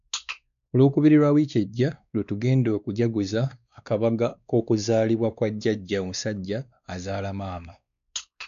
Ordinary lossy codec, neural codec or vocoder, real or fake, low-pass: none; codec, 16 kHz, 2 kbps, X-Codec, WavLM features, trained on Multilingual LibriSpeech; fake; 7.2 kHz